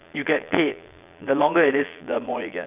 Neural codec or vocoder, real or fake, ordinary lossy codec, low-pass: vocoder, 22.05 kHz, 80 mel bands, Vocos; fake; none; 3.6 kHz